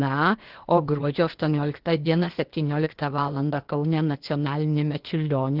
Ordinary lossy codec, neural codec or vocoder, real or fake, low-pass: Opus, 16 kbps; codec, 16 kHz, 0.8 kbps, ZipCodec; fake; 5.4 kHz